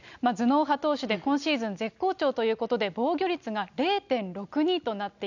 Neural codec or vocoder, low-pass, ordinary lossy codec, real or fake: none; 7.2 kHz; none; real